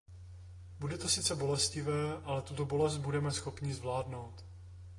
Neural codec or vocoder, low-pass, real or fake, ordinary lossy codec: none; 10.8 kHz; real; AAC, 32 kbps